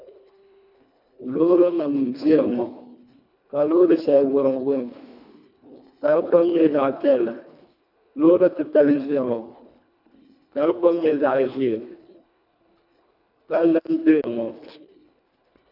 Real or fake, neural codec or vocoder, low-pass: fake; codec, 24 kHz, 1.5 kbps, HILCodec; 5.4 kHz